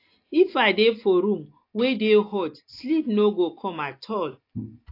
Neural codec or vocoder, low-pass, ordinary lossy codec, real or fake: none; 5.4 kHz; AAC, 32 kbps; real